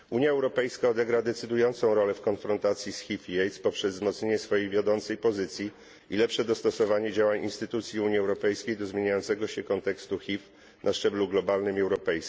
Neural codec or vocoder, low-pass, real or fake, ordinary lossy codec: none; none; real; none